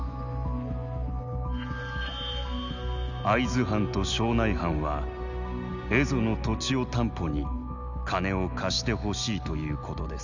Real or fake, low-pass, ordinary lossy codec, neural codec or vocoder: real; 7.2 kHz; none; none